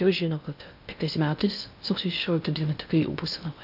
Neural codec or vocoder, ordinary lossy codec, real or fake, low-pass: codec, 16 kHz in and 24 kHz out, 0.6 kbps, FocalCodec, streaming, 2048 codes; AAC, 48 kbps; fake; 5.4 kHz